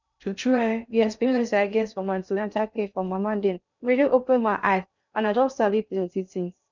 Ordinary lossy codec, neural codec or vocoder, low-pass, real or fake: none; codec, 16 kHz in and 24 kHz out, 0.6 kbps, FocalCodec, streaming, 2048 codes; 7.2 kHz; fake